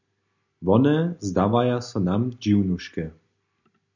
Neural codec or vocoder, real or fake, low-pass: none; real; 7.2 kHz